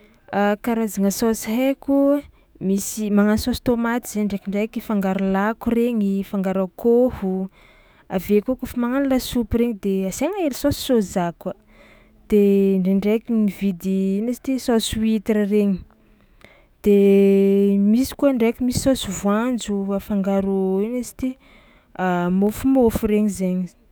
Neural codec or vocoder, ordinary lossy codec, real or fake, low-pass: autoencoder, 48 kHz, 128 numbers a frame, DAC-VAE, trained on Japanese speech; none; fake; none